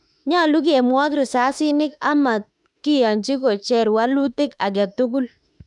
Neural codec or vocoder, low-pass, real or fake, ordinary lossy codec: autoencoder, 48 kHz, 32 numbers a frame, DAC-VAE, trained on Japanese speech; 10.8 kHz; fake; none